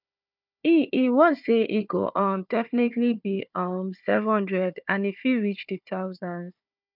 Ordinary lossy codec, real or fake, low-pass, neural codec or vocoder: none; fake; 5.4 kHz; codec, 16 kHz, 4 kbps, FunCodec, trained on Chinese and English, 50 frames a second